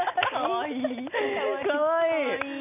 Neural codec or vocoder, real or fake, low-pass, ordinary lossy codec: none; real; 3.6 kHz; none